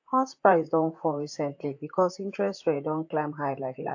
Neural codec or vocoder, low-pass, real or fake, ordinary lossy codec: vocoder, 44.1 kHz, 128 mel bands, Pupu-Vocoder; 7.2 kHz; fake; AAC, 48 kbps